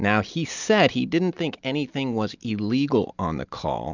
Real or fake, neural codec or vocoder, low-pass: real; none; 7.2 kHz